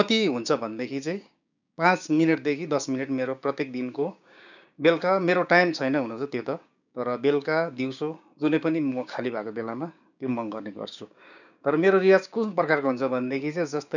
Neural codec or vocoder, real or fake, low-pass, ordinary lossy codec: codec, 16 kHz, 6 kbps, DAC; fake; 7.2 kHz; none